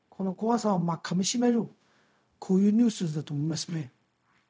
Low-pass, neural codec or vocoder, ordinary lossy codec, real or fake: none; codec, 16 kHz, 0.4 kbps, LongCat-Audio-Codec; none; fake